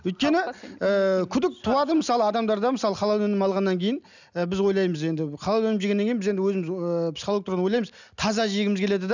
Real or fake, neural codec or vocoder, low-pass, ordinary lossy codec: real; none; 7.2 kHz; none